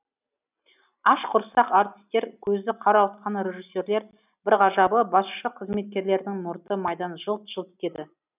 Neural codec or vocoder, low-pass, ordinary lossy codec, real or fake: none; 3.6 kHz; none; real